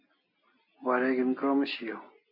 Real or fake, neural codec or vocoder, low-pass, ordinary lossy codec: real; none; 5.4 kHz; MP3, 32 kbps